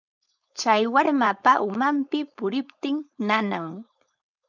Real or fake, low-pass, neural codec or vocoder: fake; 7.2 kHz; codec, 16 kHz, 4.8 kbps, FACodec